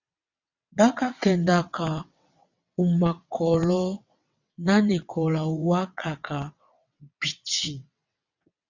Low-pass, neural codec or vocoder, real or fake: 7.2 kHz; vocoder, 22.05 kHz, 80 mel bands, WaveNeXt; fake